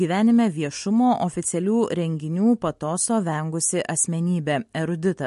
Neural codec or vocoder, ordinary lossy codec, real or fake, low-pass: none; MP3, 48 kbps; real; 14.4 kHz